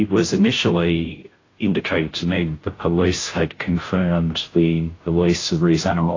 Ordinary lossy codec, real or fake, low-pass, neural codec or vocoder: AAC, 32 kbps; fake; 7.2 kHz; codec, 16 kHz, 0.5 kbps, FunCodec, trained on Chinese and English, 25 frames a second